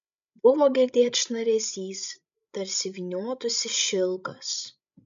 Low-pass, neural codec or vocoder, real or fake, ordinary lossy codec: 7.2 kHz; codec, 16 kHz, 16 kbps, FreqCodec, larger model; fake; MP3, 64 kbps